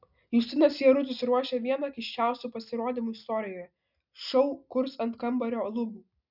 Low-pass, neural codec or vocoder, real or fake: 5.4 kHz; none; real